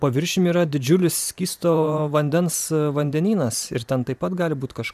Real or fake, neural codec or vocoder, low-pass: fake; vocoder, 44.1 kHz, 128 mel bands every 512 samples, BigVGAN v2; 14.4 kHz